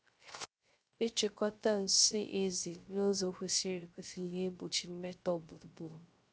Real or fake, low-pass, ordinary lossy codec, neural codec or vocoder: fake; none; none; codec, 16 kHz, 0.3 kbps, FocalCodec